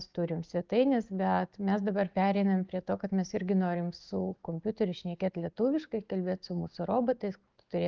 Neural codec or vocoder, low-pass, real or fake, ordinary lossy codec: none; 7.2 kHz; real; Opus, 32 kbps